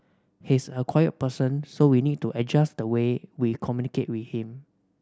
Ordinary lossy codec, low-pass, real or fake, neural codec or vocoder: none; none; real; none